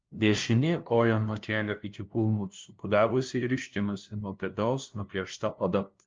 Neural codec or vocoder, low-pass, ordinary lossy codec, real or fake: codec, 16 kHz, 0.5 kbps, FunCodec, trained on LibriTTS, 25 frames a second; 7.2 kHz; Opus, 24 kbps; fake